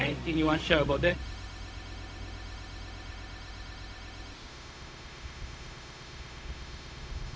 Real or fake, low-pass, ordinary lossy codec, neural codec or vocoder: fake; none; none; codec, 16 kHz, 0.4 kbps, LongCat-Audio-Codec